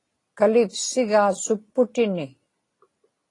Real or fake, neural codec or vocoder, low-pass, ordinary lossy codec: real; none; 10.8 kHz; AAC, 32 kbps